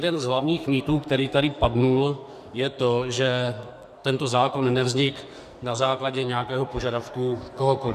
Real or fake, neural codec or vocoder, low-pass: fake; codec, 44.1 kHz, 2.6 kbps, SNAC; 14.4 kHz